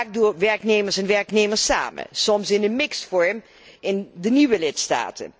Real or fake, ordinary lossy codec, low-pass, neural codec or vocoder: real; none; none; none